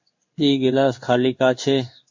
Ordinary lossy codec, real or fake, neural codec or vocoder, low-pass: MP3, 48 kbps; fake; codec, 16 kHz in and 24 kHz out, 1 kbps, XY-Tokenizer; 7.2 kHz